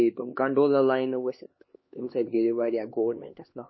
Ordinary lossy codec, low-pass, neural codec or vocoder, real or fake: MP3, 24 kbps; 7.2 kHz; codec, 16 kHz, 2 kbps, X-Codec, HuBERT features, trained on LibriSpeech; fake